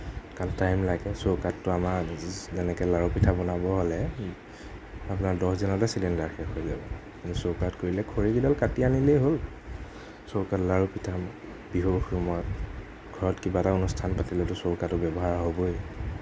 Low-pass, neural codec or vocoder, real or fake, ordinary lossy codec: none; none; real; none